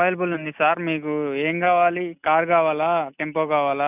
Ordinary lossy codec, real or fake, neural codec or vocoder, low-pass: none; real; none; 3.6 kHz